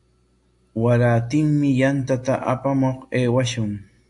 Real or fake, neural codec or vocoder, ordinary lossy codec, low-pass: real; none; AAC, 64 kbps; 10.8 kHz